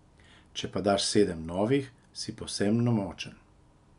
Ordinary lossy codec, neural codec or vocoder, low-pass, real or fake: none; none; 10.8 kHz; real